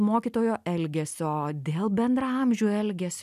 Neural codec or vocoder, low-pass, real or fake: none; 14.4 kHz; real